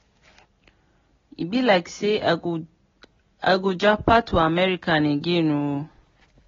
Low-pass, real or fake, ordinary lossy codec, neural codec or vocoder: 7.2 kHz; real; AAC, 24 kbps; none